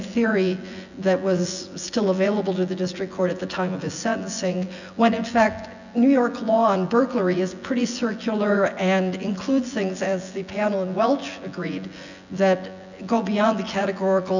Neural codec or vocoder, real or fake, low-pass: vocoder, 24 kHz, 100 mel bands, Vocos; fake; 7.2 kHz